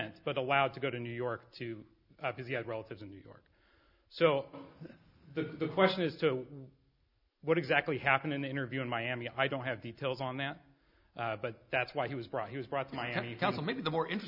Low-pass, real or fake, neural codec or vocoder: 5.4 kHz; real; none